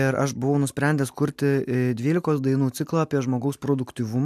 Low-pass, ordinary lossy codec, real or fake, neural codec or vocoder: 14.4 kHz; AAC, 96 kbps; real; none